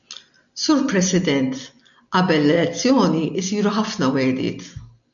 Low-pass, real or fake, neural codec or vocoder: 7.2 kHz; real; none